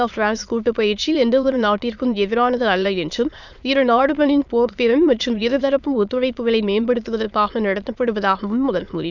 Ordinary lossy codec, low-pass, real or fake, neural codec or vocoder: none; 7.2 kHz; fake; autoencoder, 22.05 kHz, a latent of 192 numbers a frame, VITS, trained on many speakers